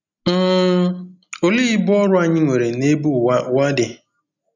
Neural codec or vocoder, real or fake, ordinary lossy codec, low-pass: none; real; none; 7.2 kHz